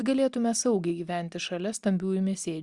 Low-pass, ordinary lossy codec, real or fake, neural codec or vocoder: 10.8 kHz; Opus, 64 kbps; real; none